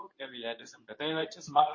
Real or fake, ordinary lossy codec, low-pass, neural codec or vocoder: fake; MP3, 32 kbps; 7.2 kHz; codec, 24 kHz, 0.9 kbps, WavTokenizer, medium speech release version 2